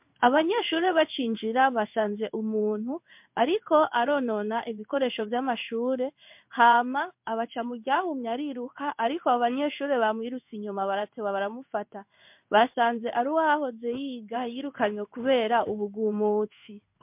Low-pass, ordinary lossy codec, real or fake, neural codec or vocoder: 3.6 kHz; MP3, 32 kbps; fake; codec, 16 kHz in and 24 kHz out, 1 kbps, XY-Tokenizer